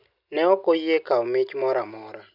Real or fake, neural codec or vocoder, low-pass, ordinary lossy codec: real; none; 5.4 kHz; none